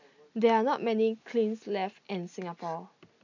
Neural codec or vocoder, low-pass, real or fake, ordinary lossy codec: none; 7.2 kHz; real; none